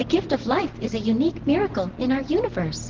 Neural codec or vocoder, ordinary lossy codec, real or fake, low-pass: none; Opus, 16 kbps; real; 7.2 kHz